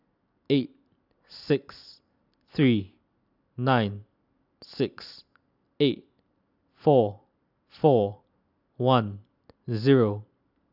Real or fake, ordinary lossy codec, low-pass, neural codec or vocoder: real; none; 5.4 kHz; none